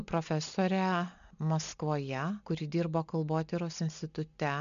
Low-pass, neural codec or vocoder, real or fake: 7.2 kHz; none; real